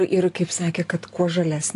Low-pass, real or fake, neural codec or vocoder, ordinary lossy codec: 10.8 kHz; real; none; AAC, 48 kbps